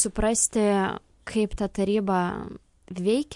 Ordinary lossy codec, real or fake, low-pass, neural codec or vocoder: MP3, 64 kbps; real; 10.8 kHz; none